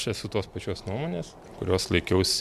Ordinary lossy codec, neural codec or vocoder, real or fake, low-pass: MP3, 96 kbps; none; real; 14.4 kHz